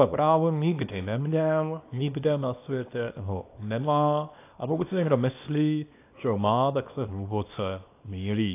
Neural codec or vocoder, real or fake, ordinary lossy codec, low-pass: codec, 24 kHz, 0.9 kbps, WavTokenizer, small release; fake; AAC, 24 kbps; 3.6 kHz